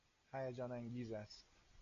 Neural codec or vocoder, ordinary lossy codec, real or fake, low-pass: none; MP3, 96 kbps; real; 7.2 kHz